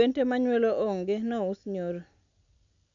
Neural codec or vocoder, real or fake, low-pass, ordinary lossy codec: none; real; 7.2 kHz; MP3, 96 kbps